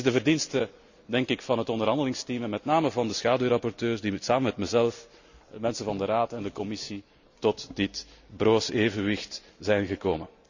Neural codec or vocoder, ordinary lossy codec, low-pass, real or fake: none; none; 7.2 kHz; real